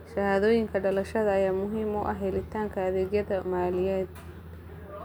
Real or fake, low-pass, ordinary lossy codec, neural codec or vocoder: real; none; none; none